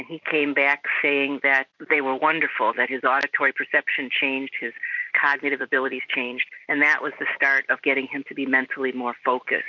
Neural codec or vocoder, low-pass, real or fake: vocoder, 44.1 kHz, 128 mel bands every 256 samples, BigVGAN v2; 7.2 kHz; fake